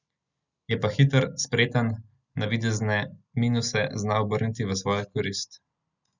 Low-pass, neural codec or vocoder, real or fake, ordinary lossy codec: 7.2 kHz; none; real; Opus, 64 kbps